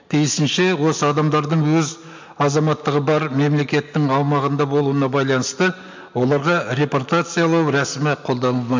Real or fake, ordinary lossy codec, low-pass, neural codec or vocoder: real; MP3, 64 kbps; 7.2 kHz; none